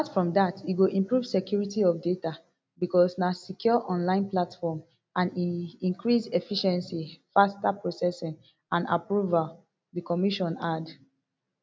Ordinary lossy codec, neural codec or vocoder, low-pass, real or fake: none; none; none; real